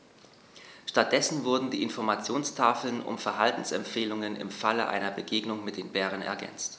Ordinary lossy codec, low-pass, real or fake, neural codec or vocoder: none; none; real; none